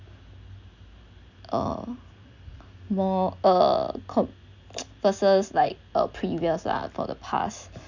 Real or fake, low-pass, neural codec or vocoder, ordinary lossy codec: real; 7.2 kHz; none; none